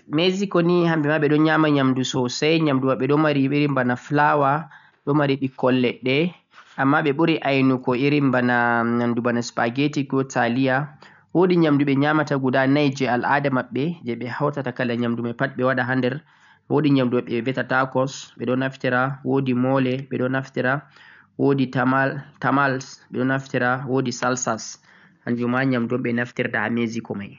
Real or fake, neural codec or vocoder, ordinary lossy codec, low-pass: real; none; none; 7.2 kHz